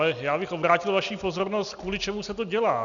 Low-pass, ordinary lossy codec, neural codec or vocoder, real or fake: 7.2 kHz; MP3, 96 kbps; none; real